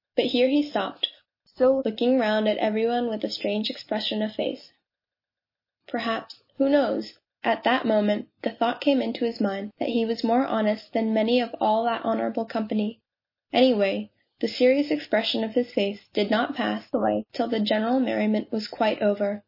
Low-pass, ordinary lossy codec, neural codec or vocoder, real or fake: 5.4 kHz; MP3, 24 kbps; none; real